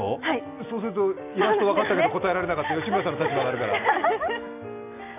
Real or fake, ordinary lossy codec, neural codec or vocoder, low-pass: real; none; none; 3.6 kHz